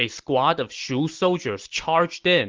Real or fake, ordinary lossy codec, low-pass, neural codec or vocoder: real; Opus, 16 kbps; 7.2 kHz; none